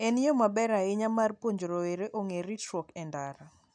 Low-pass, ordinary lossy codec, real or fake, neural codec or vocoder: 9.9 kHz; none; real; none